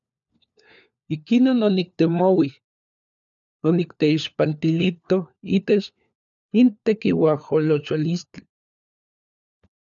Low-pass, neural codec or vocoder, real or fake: 7.2 kHz; codec, 16 kHz, 4 kbps, FunCodec, trained on LibriTTS, 50 frames a second; fake